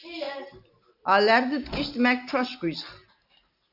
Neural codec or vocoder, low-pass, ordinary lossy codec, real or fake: none; 5.4 kHz; AAC, 48 kbps; real